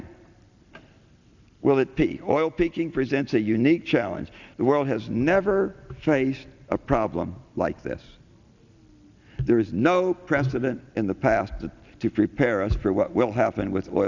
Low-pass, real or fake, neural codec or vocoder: 7.2 kHz; real; none